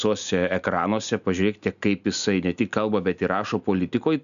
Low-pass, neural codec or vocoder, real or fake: 7.2 kHz; none; real